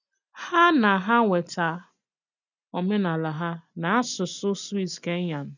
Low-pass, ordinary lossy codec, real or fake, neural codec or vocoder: 7.2 kHz; none; real; none